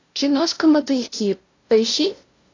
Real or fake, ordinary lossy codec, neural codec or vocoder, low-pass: fake; AAC, 32 kbps; codec, 16 kHz, 0.5 kbps, FunCodec, trained on LibriTTS, 25 frames a second; 7.2 kHz